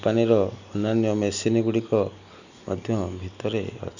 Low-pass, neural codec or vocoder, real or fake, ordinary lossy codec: 7.2 kHz; none; real; none